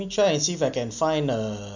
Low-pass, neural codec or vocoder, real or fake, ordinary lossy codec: 7.2 kHz; none; real; none